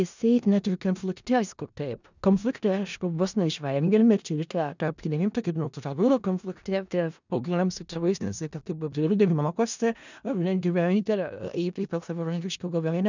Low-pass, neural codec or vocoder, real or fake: 7.2 kHz; codec, 16 kHz in and 24 kHz out, 0.4 kbps, LongCat-Audio-Codec, four codebook decoder; fake